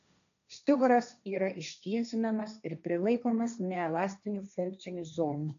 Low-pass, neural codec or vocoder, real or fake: 7.2 kHz; codec, 16 kHz, 1.1 kbps, Voila-Tokenizer; fake